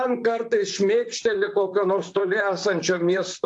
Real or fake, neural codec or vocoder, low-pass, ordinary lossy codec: fake; vocoder, 44.1 kHz, 128 mel bands, Pupu-Vocoder; 10.8 kHz; AAC, 64 kbps